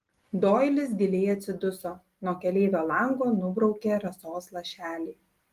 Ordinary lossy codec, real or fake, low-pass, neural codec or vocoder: Opus, 24 kbps; real; 14.4 kHz; none